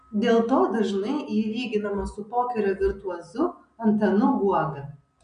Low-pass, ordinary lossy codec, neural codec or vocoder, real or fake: 9.9 kHz; MP3, 64 kbps; none; real